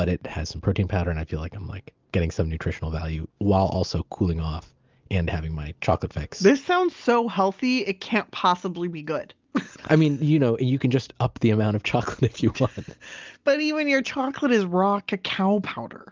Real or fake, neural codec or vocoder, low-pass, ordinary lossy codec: real; none; 7.2 kHz; Opus, 24 kbps